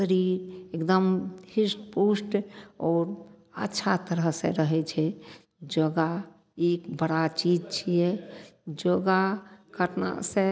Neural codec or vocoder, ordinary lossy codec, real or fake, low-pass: none; none; real; none